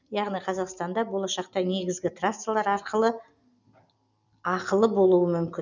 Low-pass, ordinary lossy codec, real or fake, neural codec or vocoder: 7.2 kHz; none; real; none